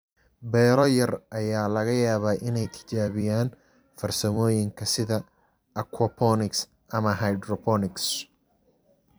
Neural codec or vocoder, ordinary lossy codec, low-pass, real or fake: vocoder, 44.1 kHz, 128 mel bands every 256 samples, BigVGAN v2; none; none; fake